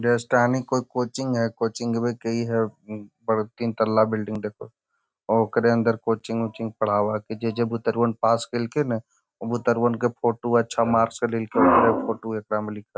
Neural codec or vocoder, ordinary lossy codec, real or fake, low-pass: none; none; real; none